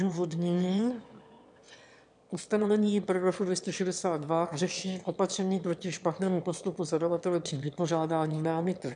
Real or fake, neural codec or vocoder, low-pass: fake; autoencoder, 22.05 kHz, a latent of 192 numbers a frame, VITS, trained on one speaker; 9.9 kHz